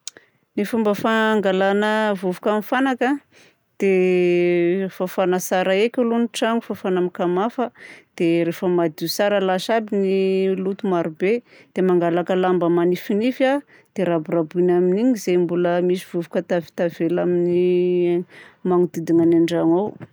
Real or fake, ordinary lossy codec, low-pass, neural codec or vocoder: real; none; none; none